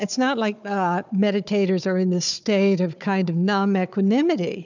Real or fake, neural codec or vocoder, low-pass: fake; codec, 16 kHz, 4 kbps, FunCodec, trained on Chinese and English, 50 frames a second; 7.2 kHz